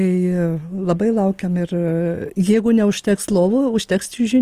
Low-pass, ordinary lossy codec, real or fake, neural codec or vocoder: 14.4 kHz; Opus, 24 kbps; real; none